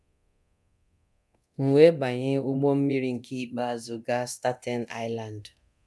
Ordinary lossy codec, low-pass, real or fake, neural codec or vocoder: none; none; fake; codec, 24 kHz, 0.9 kbps, DualCodec